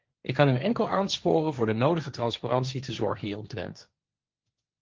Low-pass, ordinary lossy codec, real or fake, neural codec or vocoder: 7.2 kHz; Opus, 32 kbps; fake; codec, 16 kHz, 1.1 kbps, Voila-Tokenizer